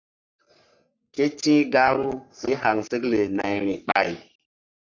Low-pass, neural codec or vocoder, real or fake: 7.2 kHz; codec, 44.1 kHz, 3.4 kbps, Pupu-Codec; fake